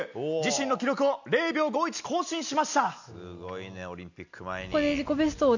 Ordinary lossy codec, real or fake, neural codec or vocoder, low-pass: none; real; none; 7.2 kHz